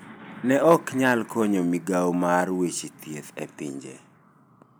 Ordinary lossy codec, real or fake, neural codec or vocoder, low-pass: none; real; none; none